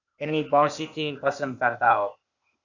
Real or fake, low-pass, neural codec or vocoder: fake; 7.2 kHz; codec, 16 kHz, 0.8 kbps, ZipCodec